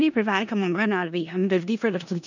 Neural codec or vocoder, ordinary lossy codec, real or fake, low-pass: codec, 16 kHz in and 24 kHz out, 0.4 kbps, LongCat-Audio-Codec, four codebook decoder; none; fake; 7.2 kHz